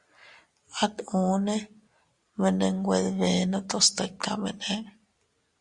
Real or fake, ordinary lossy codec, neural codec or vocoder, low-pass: real; Opus, 64 kbps; none; 10.8 kHz